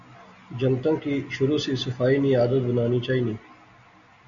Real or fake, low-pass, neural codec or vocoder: real; 7.2 kHz; none